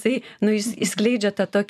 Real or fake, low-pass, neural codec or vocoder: real; 14.4 kHz; none